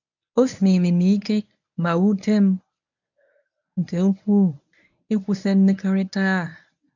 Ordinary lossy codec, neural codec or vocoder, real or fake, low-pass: none; codec, 24 kHz, 0.9 kbps, WavTokenizer, medium speech release version 1; fake; 7.2 kHz